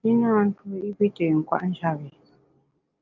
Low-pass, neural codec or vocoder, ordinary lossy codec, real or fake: 7.2 kHz; none; Opus, 32 kbps; real